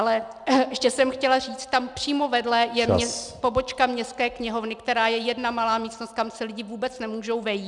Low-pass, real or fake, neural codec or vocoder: 10.8 kHz; real; none